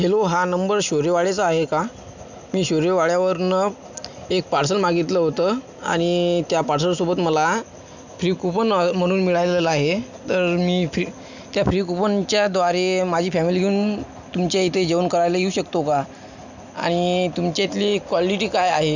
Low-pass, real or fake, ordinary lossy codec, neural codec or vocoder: 7.2 kHz; real; none; none